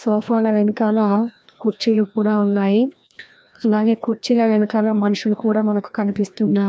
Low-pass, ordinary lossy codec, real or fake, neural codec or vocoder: none; none; fake; codec, 16 kHz, 1 kbps, FreqCodec, larger model